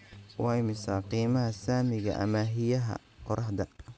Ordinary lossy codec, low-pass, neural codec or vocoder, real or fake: none; none; none; real